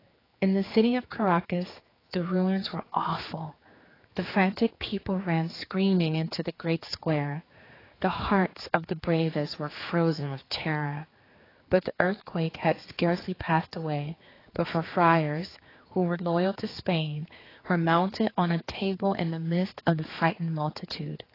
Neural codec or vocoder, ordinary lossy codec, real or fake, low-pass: codec, 16 kHz, 4 kbps, X-Codec, HuBERT features, trained on general audio; AAC, 24 kbps; fake; 5.4 kHz